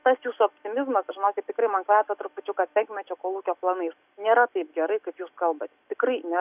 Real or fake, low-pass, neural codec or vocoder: real; 3.6 kHz; none